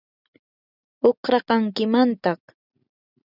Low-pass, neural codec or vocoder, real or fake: 5.4 kHz; none; real